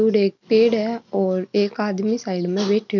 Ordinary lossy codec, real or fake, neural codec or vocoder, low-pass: none; real; none; 7.2 kHz